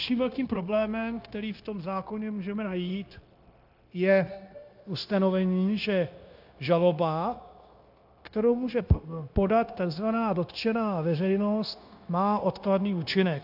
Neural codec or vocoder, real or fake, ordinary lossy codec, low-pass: codec, 16 kHz, 0.9 kbps, LongCat-Audio-Codec; fake; AAC, 48 kbps; 5.4 kHz